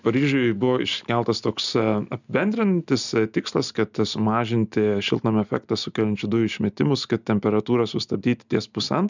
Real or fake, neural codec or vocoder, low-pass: real; none; 7.2 kHz